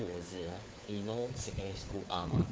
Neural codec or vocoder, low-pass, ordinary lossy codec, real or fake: codec, 16 kHz, 4 kbps, FunCodec, trained on LibriTTS, 50 frames a second; none; none; fake